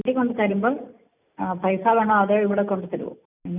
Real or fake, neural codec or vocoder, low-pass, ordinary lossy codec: real; none; 3.6 kHz; none